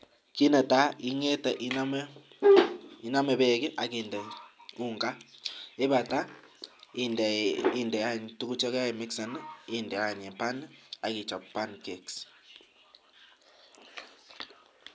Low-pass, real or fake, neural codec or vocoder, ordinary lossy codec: none; real; none; none